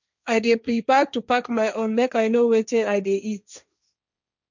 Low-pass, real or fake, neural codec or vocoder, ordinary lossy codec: 7.2 kHz; fake; codec, 16 kHz, 1.1 kbps, Voila-Tokenizer; none